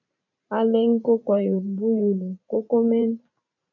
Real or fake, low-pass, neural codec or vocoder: fake; 7.2 kHz; vocoder, 44.1 kHz, 128 mel bands every 512 samples, BigVGAN v2